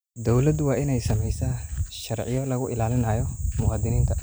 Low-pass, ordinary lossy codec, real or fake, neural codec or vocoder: none; none; real; none